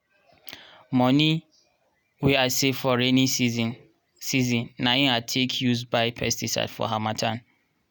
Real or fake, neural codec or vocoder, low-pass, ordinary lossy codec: real; none; none; none